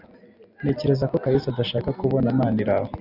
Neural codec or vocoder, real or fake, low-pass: none; real; 5.4 kHz